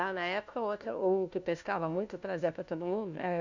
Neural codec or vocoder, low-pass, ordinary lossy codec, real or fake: codec, 16 kHz, 1 kbps, FunCodec, trained on LibriTTS, 50 frames a second; 7.2 kHz; none; fake